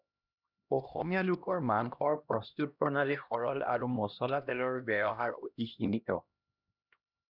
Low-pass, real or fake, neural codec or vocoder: 5.4 kHz; fake; codec, 16 kHz, 1 kbps, X-Codec, HuBERT features, trained on LibriSpeech